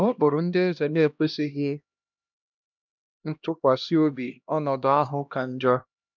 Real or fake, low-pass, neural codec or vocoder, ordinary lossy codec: fake; 7.2 kHz; codec, 16 kHz, 1 kbps, X-Codec, HuBERT features, trained on LibriSpeech; none